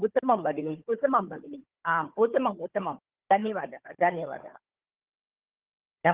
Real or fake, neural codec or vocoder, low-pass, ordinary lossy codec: fake; codec, 16 kHz, 4 kbps, FunCodec, trained on Chinese and English, 50 frames a second; 3.6 kHz; Opus, 16 kbps